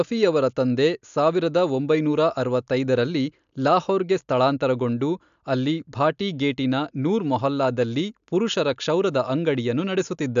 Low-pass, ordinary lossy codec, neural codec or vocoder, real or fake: 7.2 kHz; AAC, 96 kbps; none; real